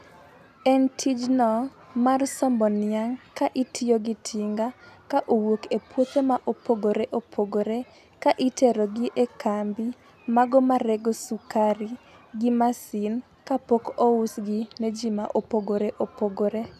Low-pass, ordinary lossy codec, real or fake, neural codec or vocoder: 14.4 kHz; none; real; none